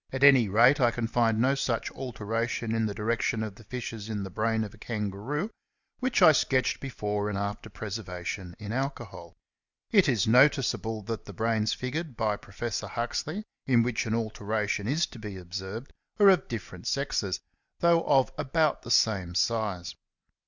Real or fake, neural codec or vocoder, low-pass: real; none; 7.2 kHz